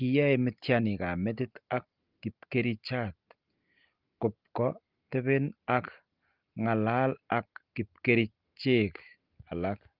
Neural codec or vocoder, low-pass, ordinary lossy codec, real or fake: none; 5.4 kHz; Opus, 32 kbps; real